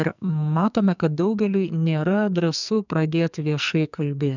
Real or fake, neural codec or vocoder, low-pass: fake; codec, 44.1 kHz, 2.6 kbps, SNAC; 7.2 kHz